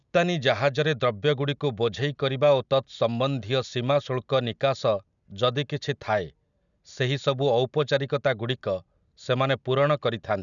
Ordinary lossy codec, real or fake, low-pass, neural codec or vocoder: none; real; 7.2 kHz; none